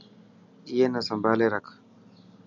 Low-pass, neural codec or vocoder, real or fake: 7.2 kHz; none; real